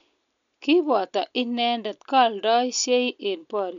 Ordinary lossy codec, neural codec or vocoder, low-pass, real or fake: MP3, 64 kbps; none; 7.2 kHz; real